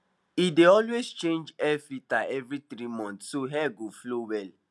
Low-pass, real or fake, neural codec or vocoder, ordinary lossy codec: none; real; none; none